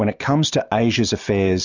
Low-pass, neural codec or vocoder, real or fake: 7.2 kHz; none; real